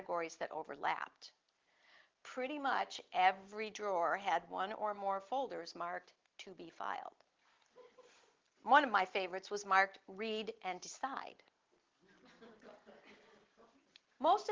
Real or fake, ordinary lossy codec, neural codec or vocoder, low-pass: real; Opus, 16 kbps; none; 7.2 kHz